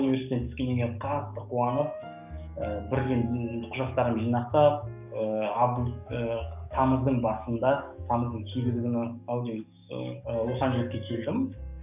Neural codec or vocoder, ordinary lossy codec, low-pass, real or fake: codec, 44.1 kHz, 7.8 kbps, DAC; none; 3.6 kHz; fake